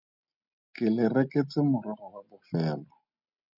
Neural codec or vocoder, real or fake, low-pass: none; real; 5.4 kHz